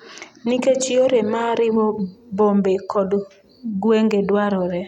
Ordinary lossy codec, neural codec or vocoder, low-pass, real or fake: none; none; 19.8 kHz; real